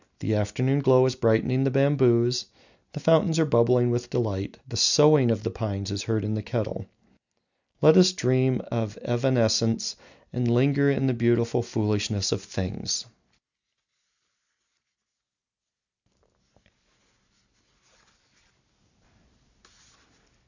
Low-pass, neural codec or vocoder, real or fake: 7.2 kHz; none; real